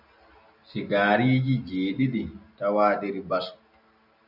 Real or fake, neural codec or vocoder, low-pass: real; none; 5.4 kHz